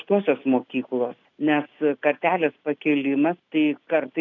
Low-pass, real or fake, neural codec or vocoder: 7.2 kHz; real; none